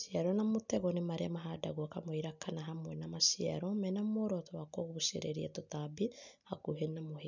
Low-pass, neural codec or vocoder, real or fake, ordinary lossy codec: 7.2 kHz; none; real; none